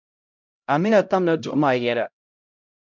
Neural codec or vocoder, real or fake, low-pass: codec, 16 kHz, 0.5 kbps, X-Codec, HuBERT features, trained on LibriSpeech; fake; 7.2 kHz